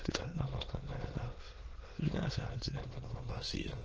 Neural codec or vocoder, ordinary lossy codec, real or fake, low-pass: autoencoder, 22.05 kHz, a latent of 192 numbers a frame, VITS, trained on many speakers; Opus, 16 kbps; fake; 7.2 kHz